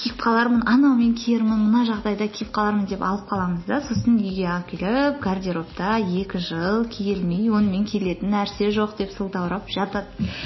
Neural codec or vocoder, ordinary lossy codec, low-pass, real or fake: none; MP3, 24 kbps; 7.2 kHz; real